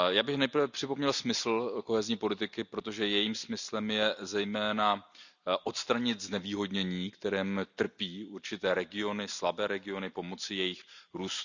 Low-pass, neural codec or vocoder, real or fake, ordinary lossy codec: 7.2 kHz; none; real; none